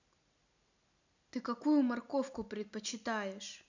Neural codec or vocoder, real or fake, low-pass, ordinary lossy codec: none; real; 7.2 kHz; none